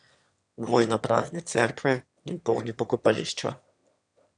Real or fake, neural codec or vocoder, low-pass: fake; autoencoder, 22.05 kHz, a latent of 192 numbers a frame, VITS, trained on one speaker; 9.9 kHz